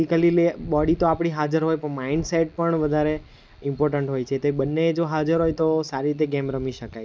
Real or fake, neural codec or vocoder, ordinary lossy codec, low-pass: real; none; none; none